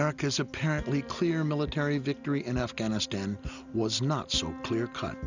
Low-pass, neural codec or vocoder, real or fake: 7.2 kHz; none; real